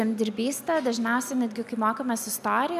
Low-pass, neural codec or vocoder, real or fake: 14.4 kHz; none; real